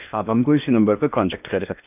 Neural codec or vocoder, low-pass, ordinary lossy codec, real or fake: codec, 16 kHz in and 24 kHz out, 0.8 kbps, FocalCodec, streaming, 65536 codes; 3.6 kHz; none; fake